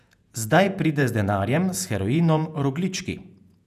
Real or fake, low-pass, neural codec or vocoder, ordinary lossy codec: fake; 14.4 kHz; vocoder, 48 kHz, 128 mel bands, Vocos; none